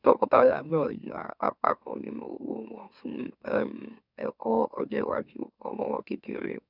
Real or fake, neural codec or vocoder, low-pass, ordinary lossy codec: fake; autoencoder, 44.1 kHz, a latent of 192 numbers a frame, MeloTTS; 5.4 kHz; none